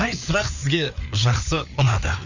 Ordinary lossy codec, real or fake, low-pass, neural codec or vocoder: none; fake; 7.2 kHz; codec, 16 kHz, 4 kbps, FreqCodec, larger model